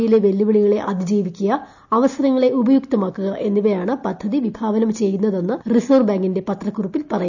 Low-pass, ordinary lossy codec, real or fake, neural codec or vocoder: 7.2 kHz; none; real; none